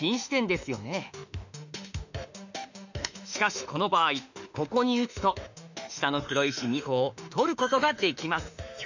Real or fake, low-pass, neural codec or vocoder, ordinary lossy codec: fake; 7.2 kHz; autoencoder, 48 kHz, 32 numbers a frame, DAC-VAE, trained on Japanese speech; none